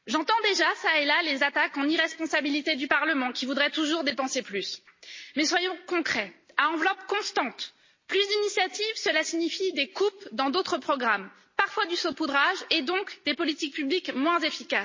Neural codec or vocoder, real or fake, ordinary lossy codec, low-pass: none; real; MP3, 32 kbps; 7.2 kHz